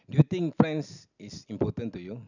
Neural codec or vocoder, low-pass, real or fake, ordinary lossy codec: none; 7.2 kHz; real; none